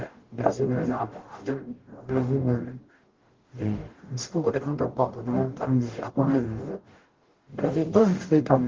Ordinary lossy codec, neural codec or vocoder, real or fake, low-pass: Opus, 16 kbps; codec, 44.1 kHz, 0.9 kbps, DAC; fake; 7.2 kHz